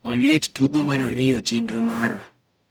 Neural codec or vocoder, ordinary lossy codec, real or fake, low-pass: codec, 44.1 kHz, 0.9 kbps, DAC; none; fake; none